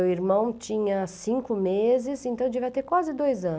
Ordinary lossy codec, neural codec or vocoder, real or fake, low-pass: none; none; real; none